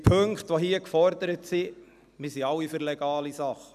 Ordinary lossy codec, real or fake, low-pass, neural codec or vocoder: none; real; 14.4 kHz; none